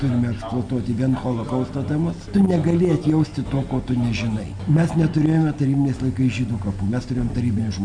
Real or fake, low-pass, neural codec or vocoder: real; 9.9 kHz; none